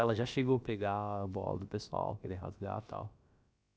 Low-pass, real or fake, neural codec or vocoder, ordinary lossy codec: none; fake; codec, 16 kHz, about 1 kbps, DyCAST, with the encoder's durations; none